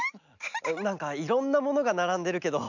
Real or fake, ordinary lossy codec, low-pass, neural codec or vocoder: real; none; 7.2 kHz; none